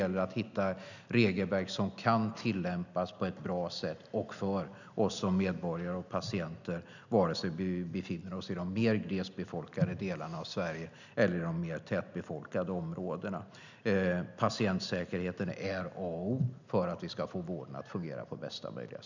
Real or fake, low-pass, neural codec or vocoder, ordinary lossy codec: real; 7.2 kHz; none; none